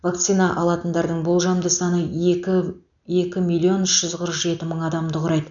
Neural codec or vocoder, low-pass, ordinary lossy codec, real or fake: none; 7.2 kHz; none; real